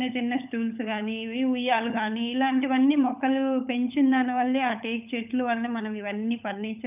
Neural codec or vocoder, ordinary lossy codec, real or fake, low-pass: codec, 16 kHz, 16 kbps, FunCodec, trained on LibriTTS, 50 frames a second; none; fake; 3.6 kHz